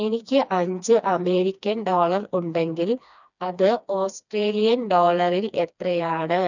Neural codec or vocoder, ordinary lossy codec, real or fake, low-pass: codec, 16 kHz, 2 kbps, FreqCodec, smaller model; none; fake; 7.2 kHz